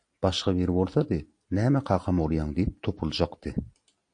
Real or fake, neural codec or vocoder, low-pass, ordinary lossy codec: real; none; 9.9 kHz; AAC, 64 kbps